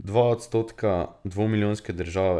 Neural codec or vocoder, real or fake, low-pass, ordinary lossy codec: none; real; none; none